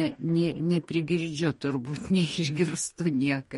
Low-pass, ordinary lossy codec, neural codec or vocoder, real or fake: 19.8 kHz; MP3, 48 kbps; codec, 44.1 kHz, 2.6 kbps, DAC; fake